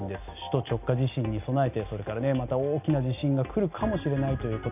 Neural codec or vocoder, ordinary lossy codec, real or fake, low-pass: none; none; real; 3.6 kHz